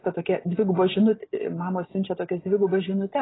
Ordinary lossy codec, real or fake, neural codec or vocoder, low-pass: AAC, 16 kbps; real; none; 7.2 kHz